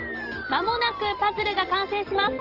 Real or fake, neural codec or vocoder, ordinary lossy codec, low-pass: real; none; Opus, 16 kbps; 5.4 kHz